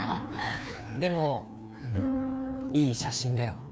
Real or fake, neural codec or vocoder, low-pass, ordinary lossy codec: fake; codec, 16 kHz, 2 kbps, FreqCodec, larger model; none; none